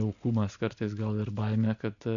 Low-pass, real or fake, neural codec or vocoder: 7.2 kHz; real; none